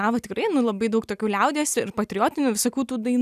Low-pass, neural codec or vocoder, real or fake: 14.4 kHz; none; real